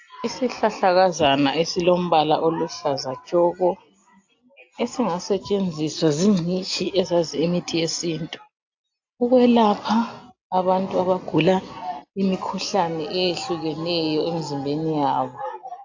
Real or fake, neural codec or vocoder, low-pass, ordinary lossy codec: real; none; 7.2 kHz; AAC, 48 kbps